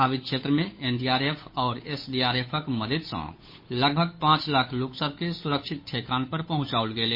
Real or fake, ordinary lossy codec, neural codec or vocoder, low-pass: fake; MP3, 24 kbps; codec, 16 kHz, 8 kbps, FunCodec, trained on Chinese and English, 25 frames a second; 5.4 kHz